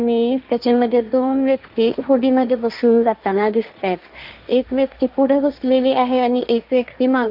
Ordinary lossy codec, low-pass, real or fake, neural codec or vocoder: none; 5.4 kHz; fake; codec, 16 kHz, 1.1 kbps, Voila-Tokenizer